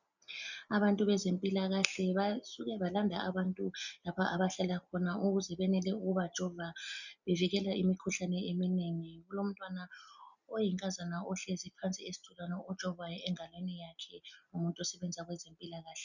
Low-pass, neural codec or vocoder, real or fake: 7.2 kHz; none; real